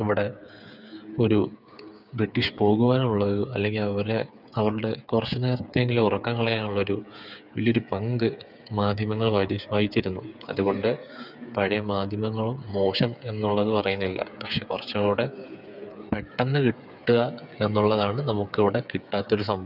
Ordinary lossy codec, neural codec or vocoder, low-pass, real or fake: none; codec, 16 kHz, 8 kbps, FreqCodec, smaller model; 5.4 kHz; fake